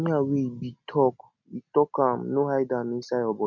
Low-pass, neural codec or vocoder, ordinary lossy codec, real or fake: 7.2 kHz; none; none; real